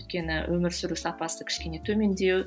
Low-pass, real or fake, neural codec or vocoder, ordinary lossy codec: none; real; none; none